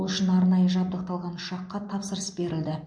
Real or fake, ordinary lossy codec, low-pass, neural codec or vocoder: real; MP3, 48 kbps; 9.9 kHz; none